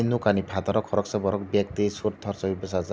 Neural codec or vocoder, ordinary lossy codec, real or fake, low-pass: none; none; real; none